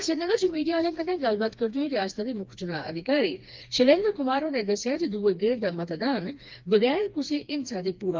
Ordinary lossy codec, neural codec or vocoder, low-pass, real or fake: Opus, 32 kbps; codec, 16 kHz, 2 kbps, FreqCodec, smaller model; 7.2 kHz; fake